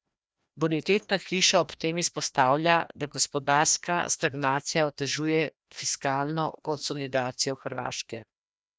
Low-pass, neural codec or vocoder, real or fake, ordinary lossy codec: none; codec, 16 kHz, 1 kbps, FreqCodec, larger model; fake; none